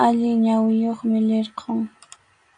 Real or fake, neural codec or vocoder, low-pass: real; none; 9.9 kHz